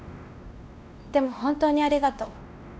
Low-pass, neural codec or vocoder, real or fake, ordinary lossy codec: none; codec, 16 kHz, 1 kbps, X-Codec, WavLM features, trained on Multilingual LibriSpeech; fake; none